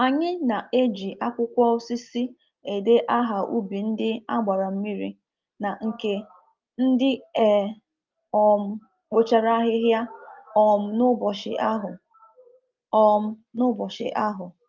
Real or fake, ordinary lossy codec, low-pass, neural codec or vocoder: real; Opus, 24 kbps; 7.2 kHz; none